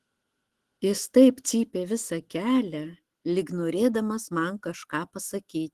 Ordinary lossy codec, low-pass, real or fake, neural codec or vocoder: Opus, 16 kbps; 14.4 kHz; fake; autoencoder, 48 kHz, 128 numbers a frame, DAC-VAE, trained on Japanese speech